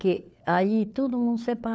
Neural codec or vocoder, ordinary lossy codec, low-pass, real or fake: codec, 16 kHz, 4 kbps, FunCodec, trained on LibriTTS, 50 frames a second; none; none; fake